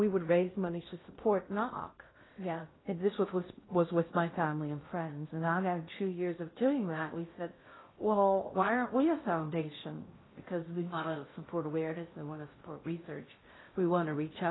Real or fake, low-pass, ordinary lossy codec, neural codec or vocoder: fake; 7.2 kHz; AAC, 16 kbps; codec, 16 kHz in and 24 kHz out, 0.6 kbps, FocalCodec, streaming, 2048 codes